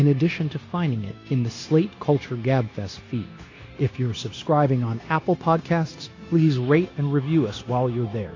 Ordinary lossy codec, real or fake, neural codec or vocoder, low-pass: AAC, 32 kbps; fake; codec, 16 kHz, 0.9 kbps, LongCat-Audio-Codec; 7.2 kHz